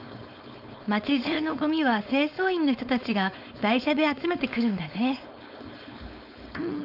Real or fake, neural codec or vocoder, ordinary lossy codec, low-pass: fake; codec, 16 kHz, 4.8 kbps, FACodec; none; 5.4 kHz